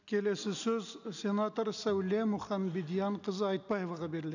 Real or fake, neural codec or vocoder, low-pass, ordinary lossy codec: real; none; 7.2 kHz; none